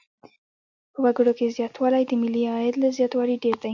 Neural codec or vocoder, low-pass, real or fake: none; 7.2 kHz; real